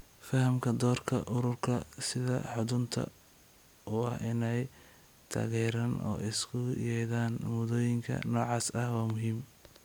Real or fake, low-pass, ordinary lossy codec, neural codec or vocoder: real; none; none; none